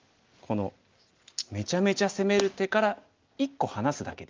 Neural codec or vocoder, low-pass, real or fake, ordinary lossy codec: none; 7.2 kHz; real; Opus, 24 kbps